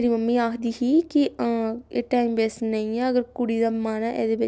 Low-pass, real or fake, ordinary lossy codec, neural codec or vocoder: none; real; none; none